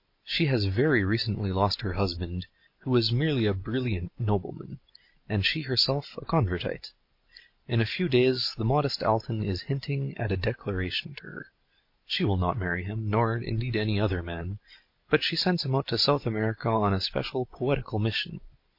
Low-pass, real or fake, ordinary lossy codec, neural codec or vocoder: 5.4 kHz; real; MP3, 32 kbps; none